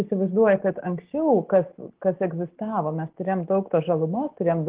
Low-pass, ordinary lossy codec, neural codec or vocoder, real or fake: 3.6 kHz; Opus, 16 kbps; none; real